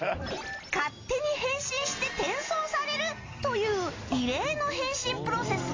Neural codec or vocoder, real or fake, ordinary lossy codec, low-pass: none; real; AAC, 32 kbps; 7.2 kHz